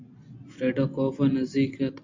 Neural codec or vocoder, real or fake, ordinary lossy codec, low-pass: none; real; MP3, 64 kbps; 7.2 kHz